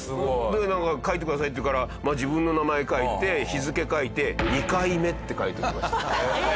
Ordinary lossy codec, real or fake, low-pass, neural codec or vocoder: none; real; none; none